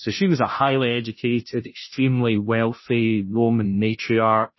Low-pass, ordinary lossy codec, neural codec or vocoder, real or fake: 7.2 kHz; MP3, 24 kbps; codec, 16 kHz, 0.5 kbps, FunCodec, trained on Chinese and English, 25 frames a second; fake